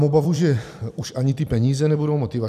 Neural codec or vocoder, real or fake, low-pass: vocoder, 44.1 kHz, 128 mel bands every 256 samples, BigVGAN v2; fake; 14.4 kHz